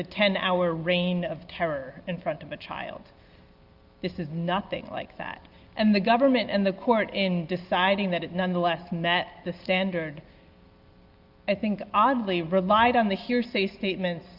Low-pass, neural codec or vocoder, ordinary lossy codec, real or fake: 5.4 kHz; none; Opus, 24 kbps; real